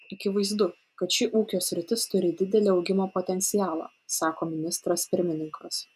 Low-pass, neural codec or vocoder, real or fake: 14.4 kHz; none; real